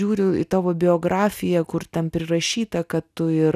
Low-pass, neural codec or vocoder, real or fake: 14.4 kHz; none; real